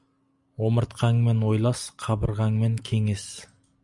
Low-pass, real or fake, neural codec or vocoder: 10.8 kHz; real; none